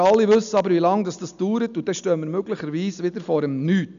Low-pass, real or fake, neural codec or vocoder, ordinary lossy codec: 7.2 kHz; real; none; none